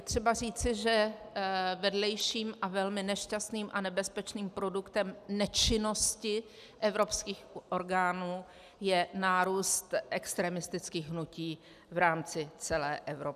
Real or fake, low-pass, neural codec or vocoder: real; 14.4 kHz; none